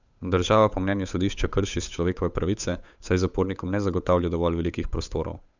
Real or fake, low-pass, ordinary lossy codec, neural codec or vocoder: fake; 7.2 kHz; none; codec, 16 kHz, 8 kbps, FunCodec, trained on Chinese and English, 25 frames a second